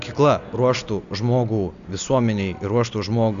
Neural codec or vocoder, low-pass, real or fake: none; 7.2 kHz; real